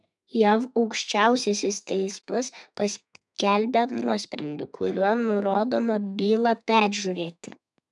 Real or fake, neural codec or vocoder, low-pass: fake; codec, 32 kHz, 1.9 kbps, SNAC; 10.8 kHz